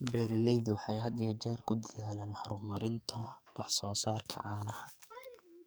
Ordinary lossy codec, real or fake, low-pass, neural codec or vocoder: none; fake; none; codec, 44.1 kHz, 2.6 kbps, SNAC